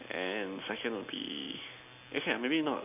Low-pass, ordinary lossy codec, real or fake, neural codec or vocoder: 3.6 kHz; none; real; none